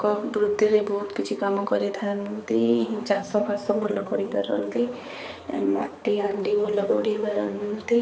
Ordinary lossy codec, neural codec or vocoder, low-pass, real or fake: none; codec, 16 kHz, 4 kbps, X-Codec, HuBERT features, trained on balanced general audio; none; fake